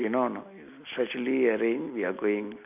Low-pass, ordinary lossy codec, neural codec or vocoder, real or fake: 3.6 kHz; none; none; real